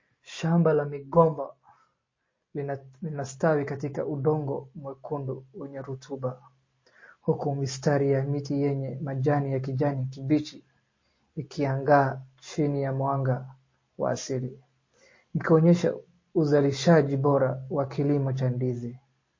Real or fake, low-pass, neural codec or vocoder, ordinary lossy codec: real; 7.2 kHz; none; MP3, 32 kbps